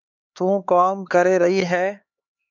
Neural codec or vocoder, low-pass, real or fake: codec, 16 kHz, 4 kbps, X-Codec, HuBERT features, trained on LibriSpeech; 7.2 kHz; fake